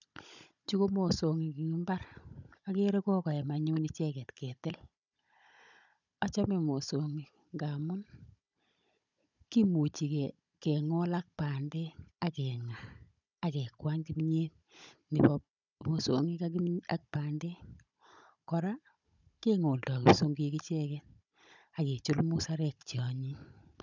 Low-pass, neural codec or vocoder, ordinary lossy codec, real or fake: 7.2 kHz; codec, 16 kHz, 16 kbps, FunCodec, trained on Chinese and English, 50 frames a second; none; fake